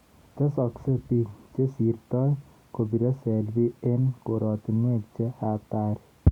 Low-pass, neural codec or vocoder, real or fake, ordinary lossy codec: 19.8 kHz; none; real; Opus, 64 kbps